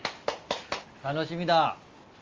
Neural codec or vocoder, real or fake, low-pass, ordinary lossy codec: none; real; 7.2 kHz; Opus, 32 kbps